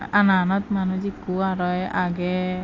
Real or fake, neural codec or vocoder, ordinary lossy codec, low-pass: real; none; AAC, 32 kbps; 7.2 kHz